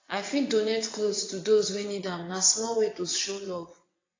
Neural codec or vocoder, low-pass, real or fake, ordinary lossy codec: vocoder, 22.05 kHz, 80 mel bands, WaveNeXt; 7.2 kHz; fake; AAC, 32 kbps